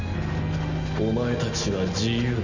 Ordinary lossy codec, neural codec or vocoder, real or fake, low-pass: none; none; real; 7.2 kHz